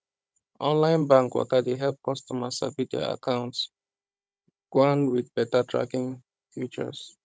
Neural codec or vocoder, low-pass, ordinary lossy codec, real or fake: codec, 16 kHz, 16 kbps, FunCodec, trained on Chinese and English, 50 frames a second; none; none; fake